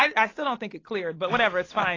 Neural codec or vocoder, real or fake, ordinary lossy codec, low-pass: none; real; AAC, 32 kbps; 7.2 kHz